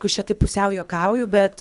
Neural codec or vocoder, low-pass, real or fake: codec, 24 kHz, 3 kbps, HILCodec; 10.8 kHz; fake